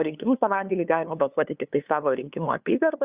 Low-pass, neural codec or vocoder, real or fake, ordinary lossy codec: 3.6 kHz; codec, 16 kHz, 2 kbps, FunCodec, trained on LibriTTS, 25 frames a second; fake; Opus, 64 kbps